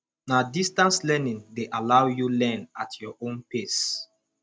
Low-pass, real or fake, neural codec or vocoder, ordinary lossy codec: none; real; none; none